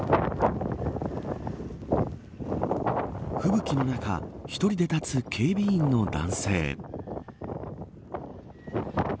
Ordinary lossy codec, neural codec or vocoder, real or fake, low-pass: none; none; real; none